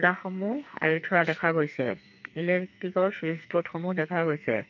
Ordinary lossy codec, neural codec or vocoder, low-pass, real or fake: none; codec, 44.1 kHz, 2.6 kbps, SNAC; 7.2 kHz; fake